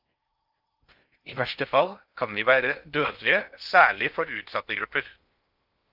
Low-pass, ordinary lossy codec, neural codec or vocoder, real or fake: 5.4 kHz; Opus, 24 kbps; codec, 16 kHz in and 24 kHz out, 0.6 kbps, FocalCodec, streaming, 4096 codes; fake